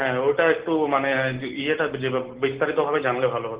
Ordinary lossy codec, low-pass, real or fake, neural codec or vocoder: Opus, 16 kbps; 3.6 kHz; real; none